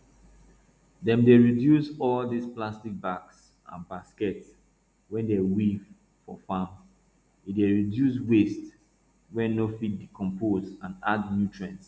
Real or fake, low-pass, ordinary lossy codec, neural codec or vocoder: real; none; none; none